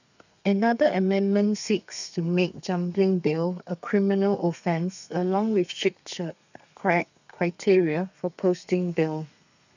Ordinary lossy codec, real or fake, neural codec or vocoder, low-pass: none; fake; codec, 32 kHz, 1.9 kbps, SNAC; 7.2 kHz